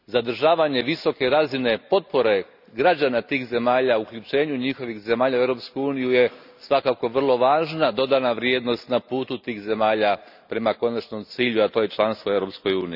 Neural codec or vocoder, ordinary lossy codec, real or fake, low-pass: none; none; real; 5.4 kHz